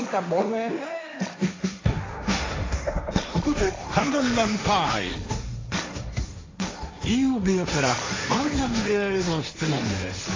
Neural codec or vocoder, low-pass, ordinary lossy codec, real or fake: codec, 16 kHz, 1.1 kbps, Voila-Tokenizer; 7.2 kHz; AAC, 32 kbps; fake